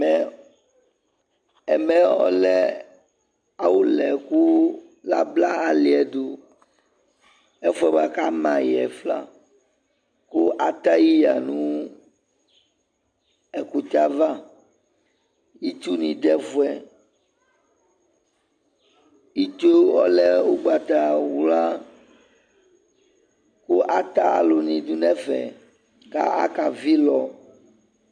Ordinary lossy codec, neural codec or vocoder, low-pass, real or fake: MP3, 64 kbps; none; 9.9 kHz; real